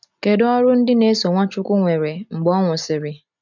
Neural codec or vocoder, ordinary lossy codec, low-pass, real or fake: none; none; 7.2 kHz; real